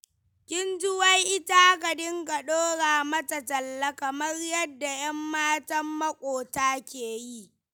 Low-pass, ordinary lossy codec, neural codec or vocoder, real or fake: none; none; none; real